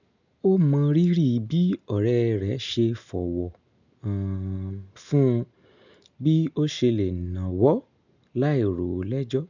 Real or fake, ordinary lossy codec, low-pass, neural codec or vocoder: real; none; 7.2 kHz; none